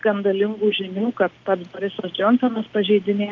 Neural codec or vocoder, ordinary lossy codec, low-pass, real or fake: none; Opus, 32 kbps; 7.2 kHz; real